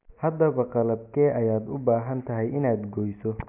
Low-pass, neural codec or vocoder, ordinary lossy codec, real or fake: 3.6 kHz; none; none; real